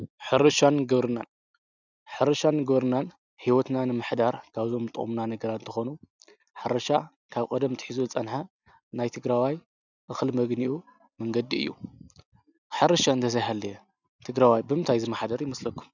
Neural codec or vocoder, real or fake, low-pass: none; real; 7.2 kHz